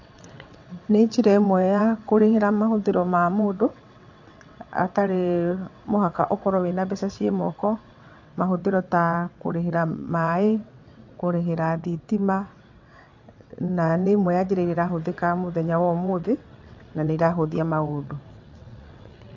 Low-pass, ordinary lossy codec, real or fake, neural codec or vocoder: 7.2 kHz; AAC, 48 kbps; fake; vocoder, 22.05 kHz, 80 mel bands, WaveNeXt